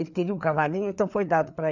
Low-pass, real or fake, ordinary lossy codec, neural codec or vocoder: 7.2 kHz; fake; none; codec, 16 kHz, 4 kbps, FreqCodec, larger model